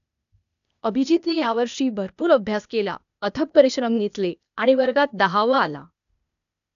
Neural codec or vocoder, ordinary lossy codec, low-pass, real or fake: codec, 16 kHz, 0.8 kbps, ZipCodec; none; 7.2 kHz; fake